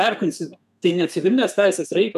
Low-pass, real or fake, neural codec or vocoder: 14.4 kHz; fake; codec, 32 kHz, 1.9 kbps, SNAC